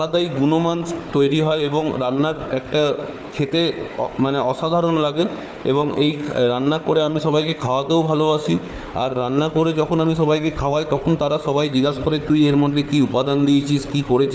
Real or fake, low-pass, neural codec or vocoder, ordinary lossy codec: fake; none; codec, 16 kHz, 4 kbps, FunCodec, trained on Chinese and English, 50 frames a second; none